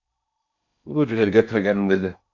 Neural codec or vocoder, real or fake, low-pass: codec, 16 kHz in and 24 kHz out, 0.6 kbps, FocalCodec, streaming, 4096 codes; fake; 7.2 kHz